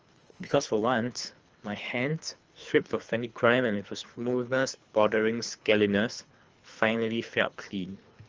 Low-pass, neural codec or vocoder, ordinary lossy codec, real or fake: 7.2 kHz; codec, 24 kHz, 3 kbps, HILCodec; Opus, 24 kbps; fake